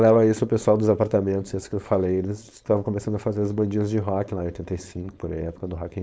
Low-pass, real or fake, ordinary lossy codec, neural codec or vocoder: none; fake; none; codec, 16 kHz, 4.8 kbps, FACodec